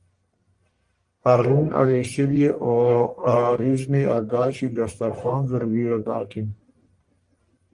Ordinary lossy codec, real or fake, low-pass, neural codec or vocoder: Opus, 32 kbps; fake; 10.8 kHz; codec, 44.1 kHz, 1.7 kbps, Pupu-Codec